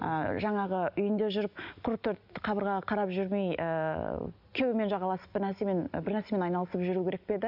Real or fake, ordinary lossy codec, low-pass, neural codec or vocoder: real; none; 5.4 kHz; none